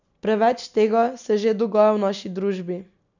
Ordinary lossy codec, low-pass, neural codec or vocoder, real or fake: none; 7.2 kHz; none; real